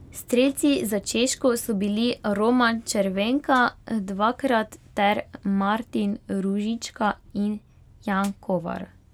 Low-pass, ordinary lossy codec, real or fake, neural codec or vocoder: 19.8 kHz; none; real; none